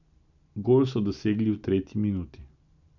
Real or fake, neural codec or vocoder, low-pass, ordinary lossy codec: real; none; 7.2 kHz; none